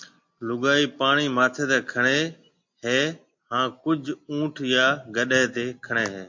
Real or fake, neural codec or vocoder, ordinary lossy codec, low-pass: real; none; MP3, 48 kbps; 7.2 kHz